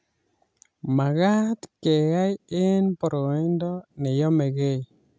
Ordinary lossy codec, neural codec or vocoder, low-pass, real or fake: none; none; none; real